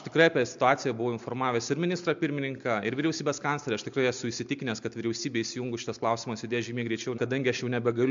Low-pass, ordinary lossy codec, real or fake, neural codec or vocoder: 7.2 kHz; MP3, 64 kbps; real; none